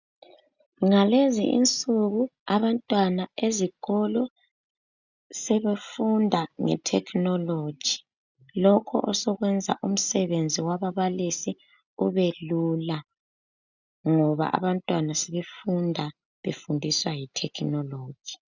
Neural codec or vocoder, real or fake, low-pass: none; real; 7.2 kHz